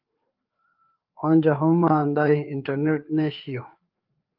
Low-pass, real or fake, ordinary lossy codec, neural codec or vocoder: 5.4 kHz; fake; Opus, 32 kbps; codec, 16 kHz, 4 kbps, FreqCodec, larger model